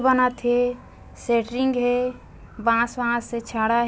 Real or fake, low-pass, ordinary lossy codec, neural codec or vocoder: real; none; none; none